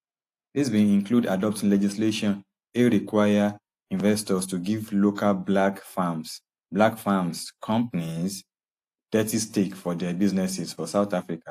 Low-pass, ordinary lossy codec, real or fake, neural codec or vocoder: 14.4 kHz; AAC, 64 kbps; real; none